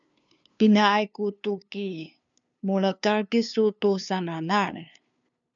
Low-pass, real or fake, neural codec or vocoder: 7.2 kHz; fake; codec, 16 kHz, 2 kbps, FunCodec, trained on LibriTTS, 25 frames a second